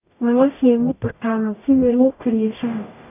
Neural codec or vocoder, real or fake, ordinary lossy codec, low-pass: codec, 44.1 kHz, 0.9 kbps, DAC; fake; none; 3.6 kHz